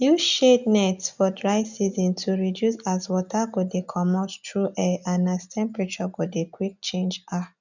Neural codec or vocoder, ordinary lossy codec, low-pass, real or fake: none; none; 7.2 kHz; real